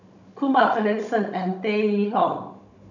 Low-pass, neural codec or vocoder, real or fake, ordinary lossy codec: 7.2 kHz; codec, 16 kHz, 16 kbps, FunCodec, trained on Chinese and English, 50 frames a second; fake; none